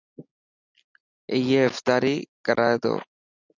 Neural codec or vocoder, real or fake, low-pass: none; real; 7.2 kHz